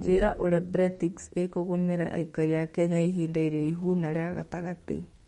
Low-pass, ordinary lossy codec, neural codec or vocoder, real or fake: 14.4 kHz; MP3, 48 kbps; codec, 32 kHz, 1.9 kbps, SNAC; fake